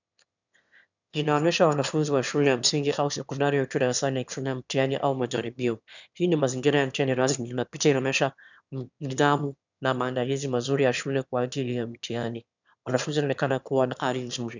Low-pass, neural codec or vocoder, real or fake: 7.2 kHz; autoencoder, 22.05 kHz, a latent of 192 numbers a frame, VITS, trained on one speaker; fake